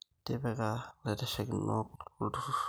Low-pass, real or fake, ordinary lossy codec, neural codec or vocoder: none; real; none; none